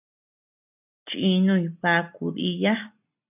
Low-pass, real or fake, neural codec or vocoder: 3.6 kHz; real; none